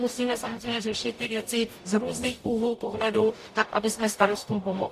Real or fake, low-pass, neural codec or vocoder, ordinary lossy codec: fake; 14.4 kHz; codec, 44.1 kHz, 0.9 kbps, DAC; AAC, 64 kbps